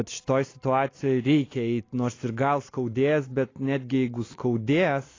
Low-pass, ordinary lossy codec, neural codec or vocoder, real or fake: 7.2 kHz; AAC, 32 kbps; none; real